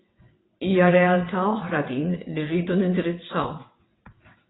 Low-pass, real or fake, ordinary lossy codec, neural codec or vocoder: 7.2 kHz; fake; AAC, 16 kbps; vocoder, 22.05 kHz, 80 mel bands, WaveNeXt